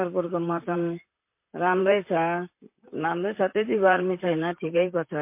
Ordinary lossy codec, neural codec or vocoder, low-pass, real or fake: MP3, 24 kbps; vocoder, 44.1 kHz, 128 mel bands, Pupu-Vocoder; 3.6 kHz; fake